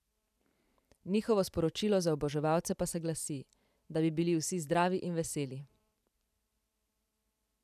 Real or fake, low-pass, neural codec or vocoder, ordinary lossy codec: fake; 14.4 kHz; vocoder, 44.1 kHz, 128 mel bands every 512 samples, BigVGAN v2; none